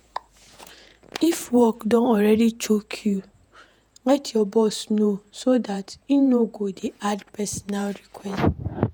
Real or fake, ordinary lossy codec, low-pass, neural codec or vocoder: fake; none; none; vocoder, 48 kHz, 128 mel bands, Vocos